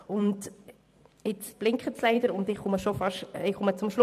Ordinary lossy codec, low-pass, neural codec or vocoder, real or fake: MP3, 96 kbps; 14.4 kHz; vocoder, 44.1 kHz, 128 mel bands every 512 samples, BigVGAN v2; fake